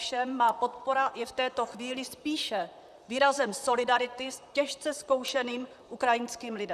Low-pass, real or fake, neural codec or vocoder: 14.4 kHz; fake; vocoder, 44.1 kHz, 128 mel bands, Pupu-Vocoder